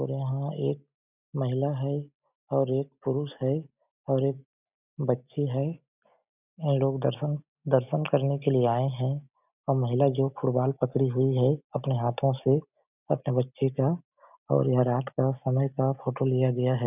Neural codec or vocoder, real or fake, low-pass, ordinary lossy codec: none; real; 3.6 kHz; none